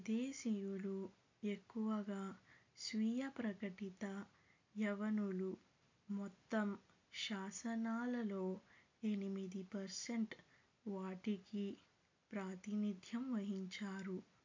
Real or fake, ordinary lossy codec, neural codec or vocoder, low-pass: real; MP3, 64 kbps; none; 7.2 kHz